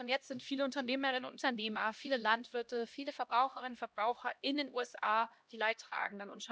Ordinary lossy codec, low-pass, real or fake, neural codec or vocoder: none; none; fake; codec, 16 kHz, 1 kbps, X-Codec, HuBERT features, trained on LibriSpeech